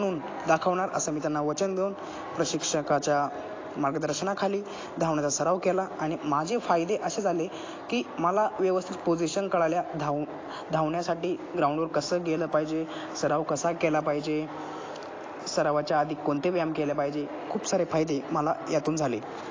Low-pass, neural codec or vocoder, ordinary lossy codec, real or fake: 7.2 kHz; none; AAC, 32 kbps; real